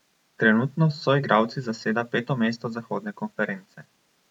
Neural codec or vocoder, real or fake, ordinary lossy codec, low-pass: vocoder, 44.1 kHz, 128 mel bands every 512 samples, BigVGAN v2; fake; none; 19.8 kHz